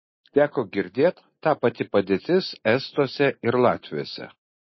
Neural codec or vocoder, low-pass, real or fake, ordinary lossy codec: none; 7.2 kHz; real; MP3, 24 kbps